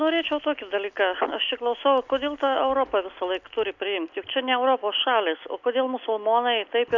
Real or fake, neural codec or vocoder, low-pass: real; none; 7.2 kHz